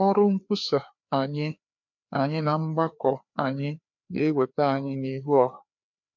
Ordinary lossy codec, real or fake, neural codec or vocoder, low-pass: MP3, 48 kbps; fake; codec, 16 kHz, 2 kbps, FreqCodec, larger model; 7.2 kHz